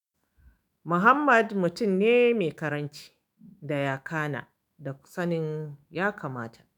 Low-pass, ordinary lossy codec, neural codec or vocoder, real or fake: none; none; autoencoder, 48 kHz, 128 numbers a frame, DAC-VAE, trained on Japanese speech; fake